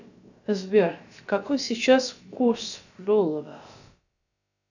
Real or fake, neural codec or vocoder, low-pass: fake; codec, 16 kHz, about 1 kbps, DyCAST, with the encoder's durations; 7.2 kHz